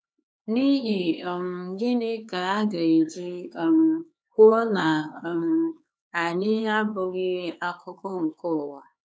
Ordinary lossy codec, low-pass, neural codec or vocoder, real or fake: none; none; codec, 16 kHz, 4 kbps, X-Codec, HuBERT features, trained on LibriSpeech; fake